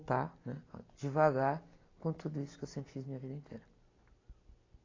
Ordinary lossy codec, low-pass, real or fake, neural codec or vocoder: AAC, 32 kbps; 7.2 kHz; real; none